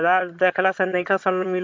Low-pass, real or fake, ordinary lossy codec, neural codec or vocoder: 7.2 kHz; fake; MP3, 48 kbps; vocoder, 22.05 kHz, 80 mel bands, HiFi-GAN